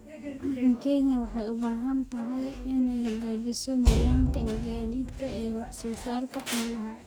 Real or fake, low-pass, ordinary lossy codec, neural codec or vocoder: fake; none; none; codec, 44.1 kHz, 2.6 kbps, DAC